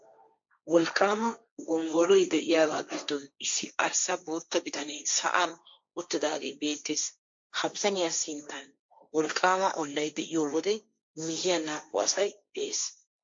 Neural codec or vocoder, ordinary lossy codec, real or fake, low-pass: codec, 16 kHz, 1.1 kbps, Voila-Tokenizer; MP3, 48 kbps; fake; 7.2 kHz